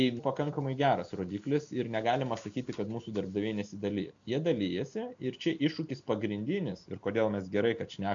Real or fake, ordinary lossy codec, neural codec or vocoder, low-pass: real; MP3, 64 kbps; none; 7.2 kHz